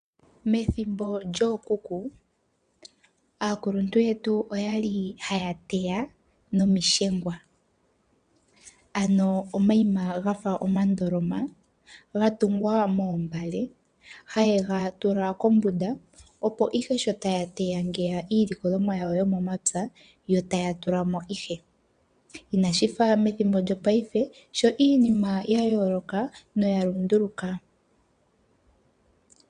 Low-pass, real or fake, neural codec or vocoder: 9.9 kHz; fake; vocoder, 22.05 kHz, 80 mel bands, WaveNeXt